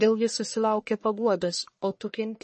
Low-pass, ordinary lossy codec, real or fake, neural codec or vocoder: 10.8 kHz; MP3, 32 kbps; fake; codec, 44.1 kHz, 1.7 kbps, Pupu-Codec